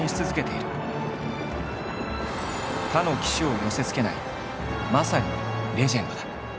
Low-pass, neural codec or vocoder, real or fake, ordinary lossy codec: none; none; real; none